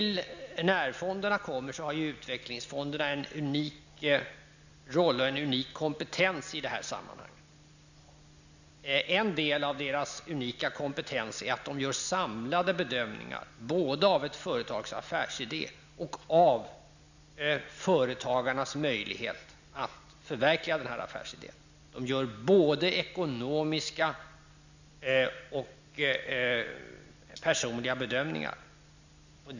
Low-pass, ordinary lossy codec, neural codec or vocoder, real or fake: 7.2 kHz; none; none; real